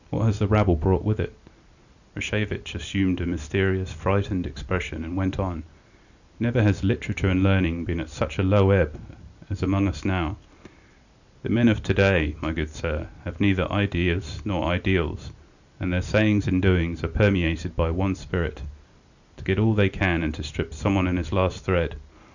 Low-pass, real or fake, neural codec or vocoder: 7.2 kHz; real; none